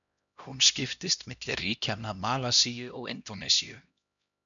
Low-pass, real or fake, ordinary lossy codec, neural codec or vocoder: 7.2 kHz; fake; MP3, 96 kbps; codec, 16 kHz, 1 kbps, X-Codec, HuBERT features, trained on LibriSpeech